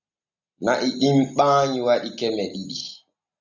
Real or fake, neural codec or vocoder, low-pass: real; none; 7.2 kHz